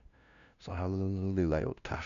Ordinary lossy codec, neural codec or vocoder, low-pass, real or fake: none; codec, 16 kHz, 0.5 kbps, FunCodec, trained on LibriTTS, 25 frames a second; 7.2 kHz; fake